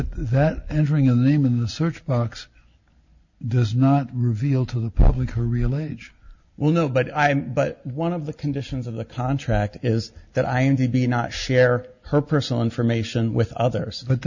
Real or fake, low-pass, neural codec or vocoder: real; 7.2 kHz; none